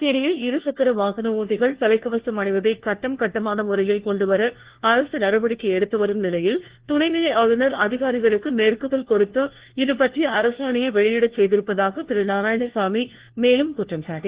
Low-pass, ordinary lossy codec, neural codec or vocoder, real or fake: 3.6 kHz; Opus, 16 kbps; codec, 16 kHz, 1 kbps, FunCodec, trained on LibriTTS, 50 frames a second; fake